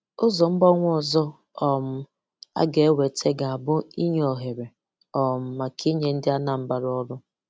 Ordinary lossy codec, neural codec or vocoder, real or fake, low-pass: none; none; real; none